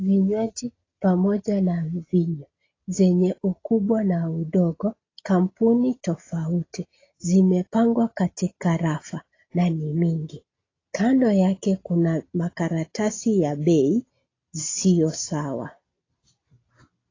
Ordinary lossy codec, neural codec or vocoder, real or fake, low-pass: AAC, 32 kbps; none; real; 7.2 kHz